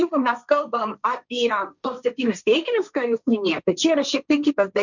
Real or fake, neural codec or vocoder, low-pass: fake; codec, 16 kHz, 1.1 kbps, Voila-Tokenizer; 7.2 kHz